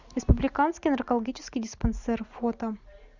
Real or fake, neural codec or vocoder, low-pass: real; none; 7.2 kHz